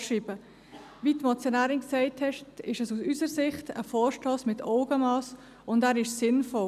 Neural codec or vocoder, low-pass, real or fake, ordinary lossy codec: none; 14.4 kHz; real; none